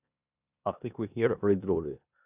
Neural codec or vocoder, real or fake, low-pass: codec, 16 kHz in and 24 kHz out, 0.9 kbps, LongCat-Audio-Codec, four codebook decoder; fake; 3.6 kHz